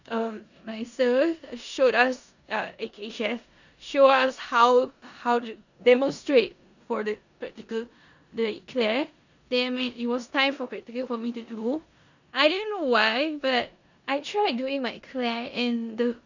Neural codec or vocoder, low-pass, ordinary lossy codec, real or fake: codec, 16 kHz in and 24 kHz out, 0.9 kbps, LongCat-Audio-Codec, four codebook decoder; 7.2 kHz; none; fake